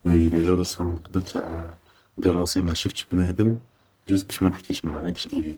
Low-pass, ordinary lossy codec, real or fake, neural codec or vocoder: none; none; fake; codec, 44.1 kHz, 1.7 kbps, Pupu-Codec